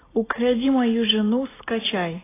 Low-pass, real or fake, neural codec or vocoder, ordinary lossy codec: 3.6 kHz; real; none; AAC, 16 kbps